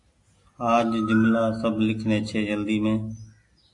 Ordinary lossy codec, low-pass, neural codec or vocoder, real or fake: AAC, 48 kbps; 10.8 kHz; none; real